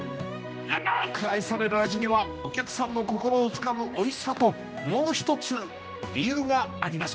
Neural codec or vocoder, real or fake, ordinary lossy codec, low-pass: codec, 16 kHz, 1 kbps, X-Codec, HuBERT features, trained on general audio; fake; none; none